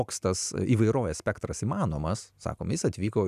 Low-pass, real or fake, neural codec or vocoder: 14.4 kHz; real; none